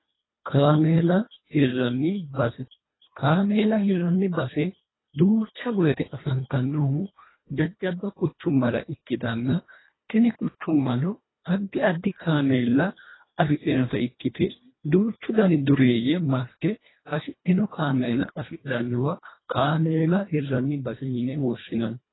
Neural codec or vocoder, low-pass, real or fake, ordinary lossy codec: codec, 24 kHz, 1.5 kbps, HILCodec; 7.2 kHz; fake; AAC, 16 kbps